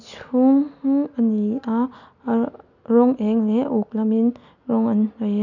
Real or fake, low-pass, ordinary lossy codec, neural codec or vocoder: real; 7.2 kHz; none; none